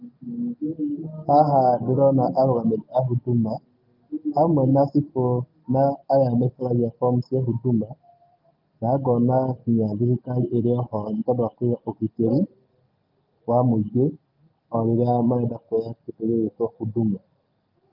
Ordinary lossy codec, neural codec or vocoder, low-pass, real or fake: Opus, 24 kbps; none; 5.4 kHz; real